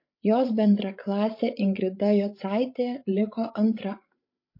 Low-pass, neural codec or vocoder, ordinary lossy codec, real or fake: 5.4 kHz; codec, 16 kHz, 16 kbps, FreqCodec, larger model; MP3, 32 kbps; fake